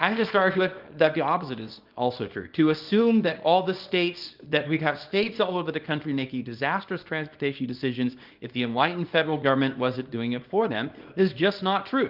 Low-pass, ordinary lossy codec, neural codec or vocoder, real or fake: 5.4 kHz; Opus, 24 kbps; codec, 24 kHz, 0.9 kbps, WavTokenizer, small release; fake